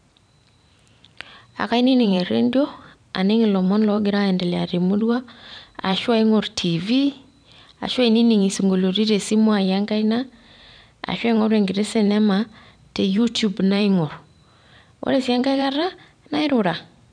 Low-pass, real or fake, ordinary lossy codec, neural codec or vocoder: 9.9 kHz; fake; none; vocoder, 44.1 kHz, 128 mel bands every 512 samples, BigVGAN v2